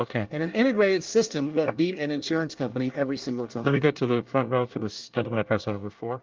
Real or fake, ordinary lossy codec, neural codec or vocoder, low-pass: fake; Opus, 32 kbps; codec, 24 kHz, 1 kbps, SNAC; 7.2 kHz